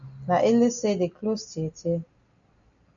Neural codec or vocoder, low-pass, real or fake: none; 7.2 kHz; real